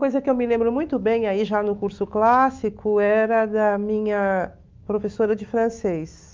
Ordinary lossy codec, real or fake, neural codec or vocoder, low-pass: Opus, 24 kbps; fake; autoencoder, 48 kHz, 128 numbers a frame, DAC-VAE, trained on Japanese speech; 7.2 kHz